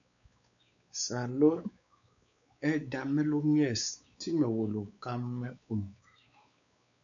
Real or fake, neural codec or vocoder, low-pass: fake; codec, 16 kHz, 4 kbps, X-Codec, WavLM features, trained on Multilingual LibriSpeech; 7.2 kHz